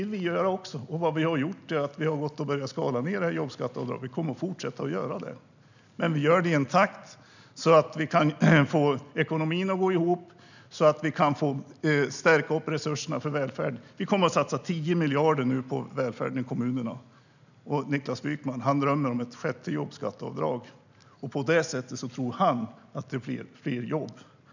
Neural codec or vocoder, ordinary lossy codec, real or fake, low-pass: none; none; real; 7.2 kHz